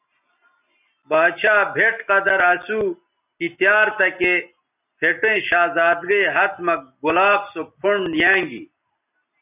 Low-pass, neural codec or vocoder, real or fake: 3.6 kHz; none; real